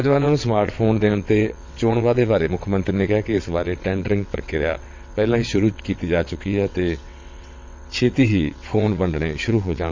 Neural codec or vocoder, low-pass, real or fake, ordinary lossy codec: vocoder, 22.05 kHz, 80 mel bands, WaveNeXt; 7.2 kHz; fake; MP3, 64 kbps